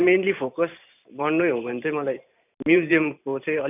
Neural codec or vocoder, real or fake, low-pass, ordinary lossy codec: vocoder, 44.1 kHz, 128 mel bands every 512 samples, BigVGAN v2; fake; 3.6 kHz; none